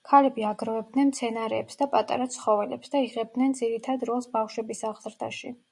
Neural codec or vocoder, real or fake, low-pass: none; real; 10.8 kHz